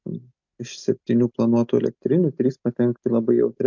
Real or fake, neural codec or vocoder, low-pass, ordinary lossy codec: real; none; 7.2 kHz; MP3, 64 kbps